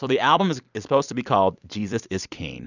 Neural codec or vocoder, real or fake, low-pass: vocoder, 44.1 kHz, 128 mel bands every 256 samples, BigVGAN v2; fake; 7.2 kHz